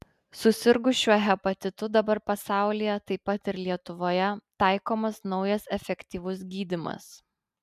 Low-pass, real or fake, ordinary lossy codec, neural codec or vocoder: 14.4 kHz; real; MP3, 96 kbps; none